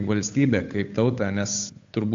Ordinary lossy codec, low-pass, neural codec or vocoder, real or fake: AAC, 64 kbps; 7.2 kHz; codec, 16 kHz, 8 kbps, FunCodec, trained on Chinese and English, 25 frames a second; fake